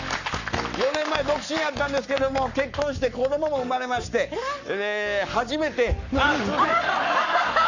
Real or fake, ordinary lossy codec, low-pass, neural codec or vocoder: fake; none; 7.2 kHz; codec, 44.1 kHz, 7.8 kbps, Pupu-Codec